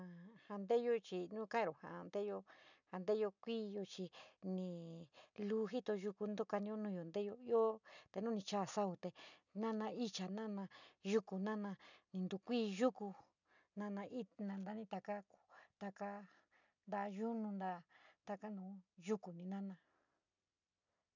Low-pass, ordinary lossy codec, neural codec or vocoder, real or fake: 7.2 kHz; none; none; real